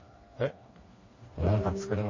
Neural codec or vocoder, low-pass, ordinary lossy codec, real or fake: codec, 16 kHz, 2 kbps, FreqCodec, smaller model; 7.2 kHz; MP3, 32 kbps; fake